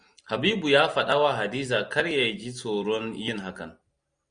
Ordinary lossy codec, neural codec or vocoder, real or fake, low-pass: Opus, 64 kbps; none; real; 9.9 kHz